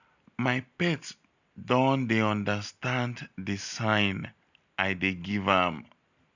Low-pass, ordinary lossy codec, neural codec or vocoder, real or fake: 7.2 kHz; none; none; real